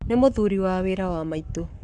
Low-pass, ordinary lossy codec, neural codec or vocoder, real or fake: 10.8 kHz; MP3, 96 kbps; codec, 44.1 kHz, 7.8 kbps, Pupu-Codec; fake